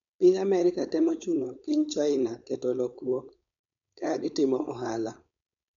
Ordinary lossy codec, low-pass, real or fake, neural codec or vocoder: none; 7.2 kHz; fake; codec, 16 kHz, 4.8 kbps, FACodec